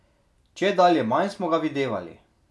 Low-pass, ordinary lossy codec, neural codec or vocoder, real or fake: none; none; none; real